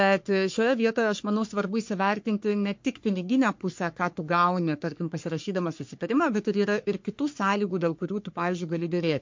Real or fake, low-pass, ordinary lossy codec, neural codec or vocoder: fake; 7.2 kHz; MP3, 48 kbps; codec, 44.1 kHz, 3.4 kbps, Pupu-Codec